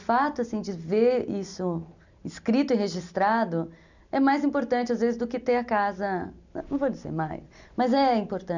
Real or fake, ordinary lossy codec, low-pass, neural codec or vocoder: real; none; 7.2 kHz; none